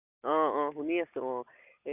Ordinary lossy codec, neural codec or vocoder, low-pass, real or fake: none; none; 3.6 kHz; real